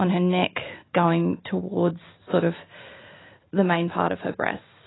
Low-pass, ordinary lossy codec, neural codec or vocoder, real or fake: 7.2 kHz; AAC, 16 kbps; none; real